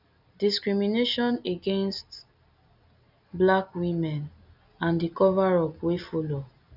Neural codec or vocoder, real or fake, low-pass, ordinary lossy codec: none; real; 5.4 kHz; none